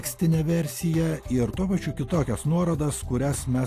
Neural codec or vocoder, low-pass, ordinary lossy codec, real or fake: none; 14.4 kHz; AAC, 64 kbps; real